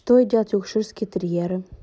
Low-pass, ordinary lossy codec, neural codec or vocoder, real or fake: none; none; none; real